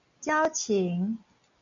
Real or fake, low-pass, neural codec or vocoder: real; 7.2 kHz; none